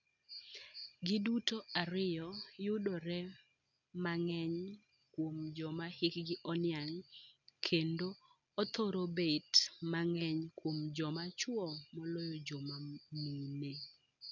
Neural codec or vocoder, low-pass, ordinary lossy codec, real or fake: none; 7.2 kHz; none; real